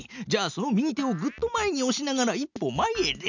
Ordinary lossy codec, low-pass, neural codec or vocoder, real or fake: none; 7.2 kHz; none; real